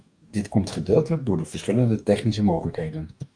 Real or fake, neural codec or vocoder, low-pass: fake; codec, 44.1 kHz, 2.6 kbps, DAC; 9.9 kHz